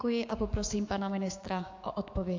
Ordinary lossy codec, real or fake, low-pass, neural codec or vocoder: AAC, 48 kbps; fake; 7.2 kHz; codec, 16 kHz, 6 kbps, DAC